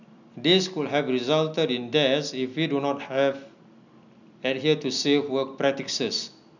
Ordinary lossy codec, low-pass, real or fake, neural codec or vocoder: none; 7.2 kHz; real; none